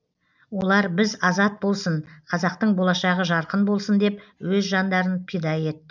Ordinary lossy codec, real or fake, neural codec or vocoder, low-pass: none; real; none; 7.2 kHz